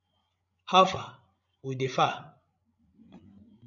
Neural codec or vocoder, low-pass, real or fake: codec, 16 kHz, 16 kbps, FreqCodec, larger model; 7.2 kHz; fake